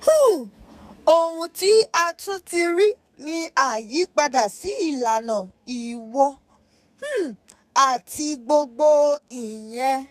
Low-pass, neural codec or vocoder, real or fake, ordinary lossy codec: 14.4 kHz; codec, 32 kHz, 1.9 kbps, SNAC; fake; none